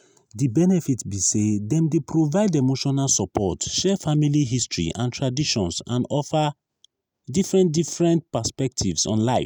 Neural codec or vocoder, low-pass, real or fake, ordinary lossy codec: none; none; real; none